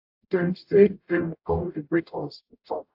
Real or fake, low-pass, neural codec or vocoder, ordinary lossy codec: fake; 5.4 kHz; codec, 44.1 kHz, 0.9 kbps, DAC; AAC, 48 kbps